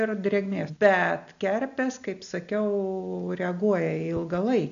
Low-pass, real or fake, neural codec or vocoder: 7.2 kHz; real; none